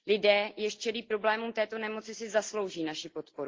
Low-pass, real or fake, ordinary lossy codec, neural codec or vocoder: 7.2 kHz; real; Opus, 16 kbps; none